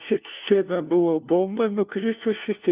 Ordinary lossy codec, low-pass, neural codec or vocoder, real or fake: Opus, 32 kbps; 3.6 kHz; codec, 16 kHz, 1 kbps, FunCodec, trained on LibriTTS, 50 frames a second; fake